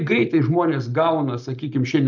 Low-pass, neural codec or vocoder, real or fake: 7.2 kHz; none; real